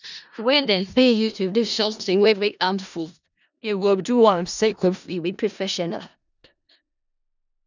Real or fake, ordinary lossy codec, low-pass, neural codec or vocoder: fake; none; 7.2 kHz; codec, 16 kHz in and 24 kHz out, 0.4 kbps, LongCat-Audio-Codec, four codebook decoder